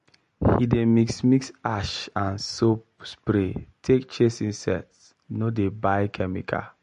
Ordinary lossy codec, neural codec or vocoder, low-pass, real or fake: MP3, 48 kbps; none; 14.4 kHz; real